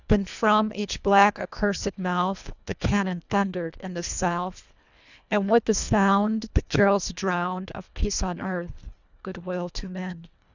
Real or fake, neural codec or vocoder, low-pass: fake; codec, 24 kHz, 1.5 kbps, HILCodec; 7.2 kHz